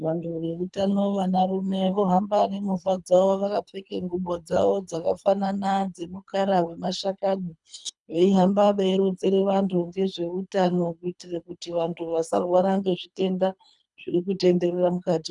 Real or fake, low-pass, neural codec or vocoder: fake; 10.8 kHz; codec, 24 kHz, 3 kbps, HILCodec